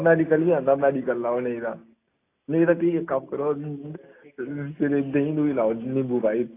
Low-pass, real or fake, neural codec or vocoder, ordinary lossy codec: 3.6 kHz; real; none; AAC, 24 kbps